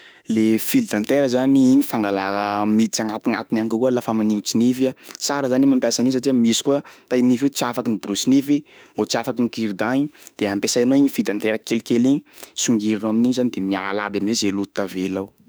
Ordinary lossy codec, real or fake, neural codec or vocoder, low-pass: none; fake; autoencoder, 48 kHz, 32 numbers a frame, DAC-VAE, trained on Japanese speech; none